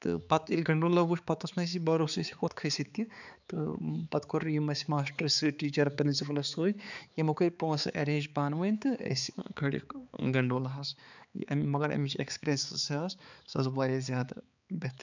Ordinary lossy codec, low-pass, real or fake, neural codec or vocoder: none; 7.2 kHz; fake; codec, 16 kHz, 4 kbps, X-Codec, HuBERT features, trained on balanced general audio